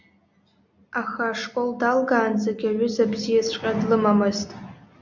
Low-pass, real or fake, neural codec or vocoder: 7.2 kHz; real; none